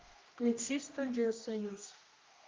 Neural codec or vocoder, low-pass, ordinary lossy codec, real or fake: codec, 16 kHz, 1 kbps, X-Codec, HuBERT features, trained on general audio; 7.2 kHz; Opus, 24 kbps; fake